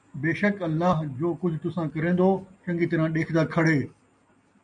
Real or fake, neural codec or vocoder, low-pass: real; none; 9.9 kHz